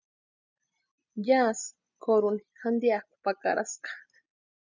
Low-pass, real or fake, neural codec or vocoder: 7.2 kHz; real; none